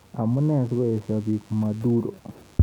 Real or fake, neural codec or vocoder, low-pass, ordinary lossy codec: real; none; 19.8 kHz; none